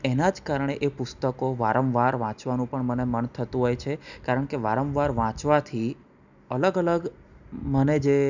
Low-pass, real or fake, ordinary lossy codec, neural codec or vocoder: 7.2 kHz; real; none; none